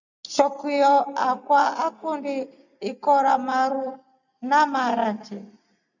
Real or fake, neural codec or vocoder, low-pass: real; none; 7.2 kHz